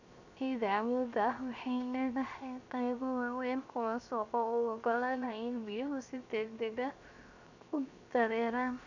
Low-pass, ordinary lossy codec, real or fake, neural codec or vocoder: 7.2 kHz; none; fake; codec, 16 kHz, 0.7 kbps, FocalCodec